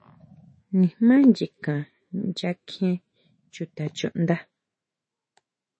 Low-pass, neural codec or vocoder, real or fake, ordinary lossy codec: 9.9 kHz; autoencoder, 48 kHz, 128 numbers a frame, DAC-VAE, trained on Japanese speech; fake; MP3, 32 kbps